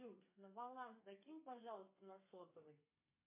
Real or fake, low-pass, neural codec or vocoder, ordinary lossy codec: fake; 3.6 kHz; codec, 32 kHz, 1.9 kbps, SNAC; MP3, 24 kbps